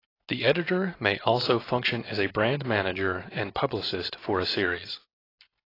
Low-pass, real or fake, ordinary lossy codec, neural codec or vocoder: 5.4 kHz; real; AAC, 24 kbps; none